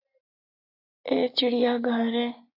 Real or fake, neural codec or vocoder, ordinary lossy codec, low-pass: real; none; AAC, 32 kbps; 5.4 kHz